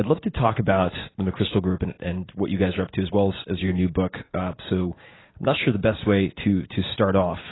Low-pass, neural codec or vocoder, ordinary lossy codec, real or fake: 7.2 kHz; none; AAC, 16 kbps; real